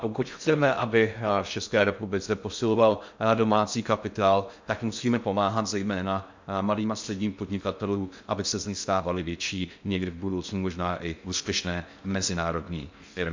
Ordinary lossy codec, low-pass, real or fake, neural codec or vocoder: MP3, 64 kbps; 7.2 kHz; fake; codec, 16 kHz in and 24 kHz out, 0.6 kbps, FocalCodec, streaming, 2048 codes